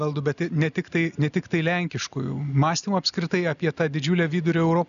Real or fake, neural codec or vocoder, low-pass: real; none; 7.2 kHz